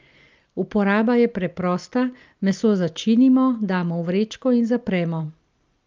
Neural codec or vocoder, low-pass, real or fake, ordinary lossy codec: none; 7.2 kHz; real; Opus, 24 kbps